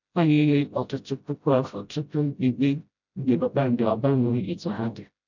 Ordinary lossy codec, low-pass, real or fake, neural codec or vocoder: none; 7.2 kHz; fake; codec, 16 kHz, 0.5 kbps, FreqCodec, smaller model